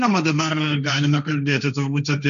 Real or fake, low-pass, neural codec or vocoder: fake; 7.2 kHz; codec, 16 kHz, 1.1 kbps, Voila-Tokenizer